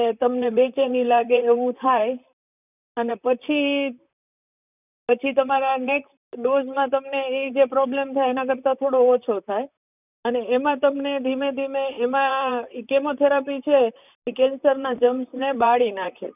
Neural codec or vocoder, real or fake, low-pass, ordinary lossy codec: vocoder, 44.1 kHz, 128 mel bands, Pupu-Vocoder; fake; 3.6 kHz; none